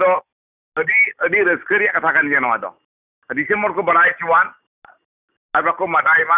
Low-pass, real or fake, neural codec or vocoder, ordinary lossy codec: 3.6 kHz; real; none; none